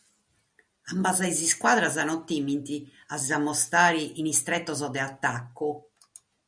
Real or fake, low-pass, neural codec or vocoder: real; 9.9 kHz; none